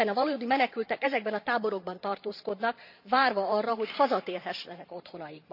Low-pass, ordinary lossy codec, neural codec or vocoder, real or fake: 5.4 kHz; none; vocoder, 44.1 kHz, 128 mel bands every 512 samples, BigVGAN v2; fake